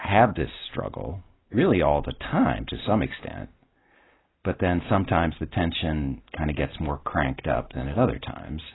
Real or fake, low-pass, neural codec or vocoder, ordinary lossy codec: real; 7.2 kHz; none; AAC, 16 kbps